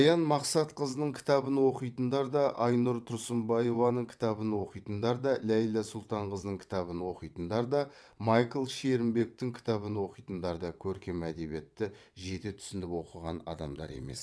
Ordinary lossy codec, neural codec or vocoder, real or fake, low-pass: none; vocoder, 22.05 kHz, 80 mel bands, WaveNeXt; fake; none